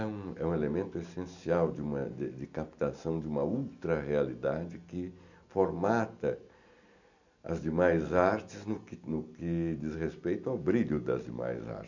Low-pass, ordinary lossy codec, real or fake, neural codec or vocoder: 7.2 kHz; none; real; none